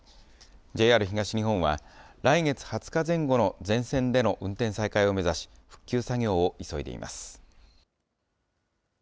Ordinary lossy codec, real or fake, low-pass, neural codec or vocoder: none; real; none; none